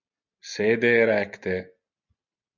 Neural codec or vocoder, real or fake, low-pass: none; real; 7.2 kHz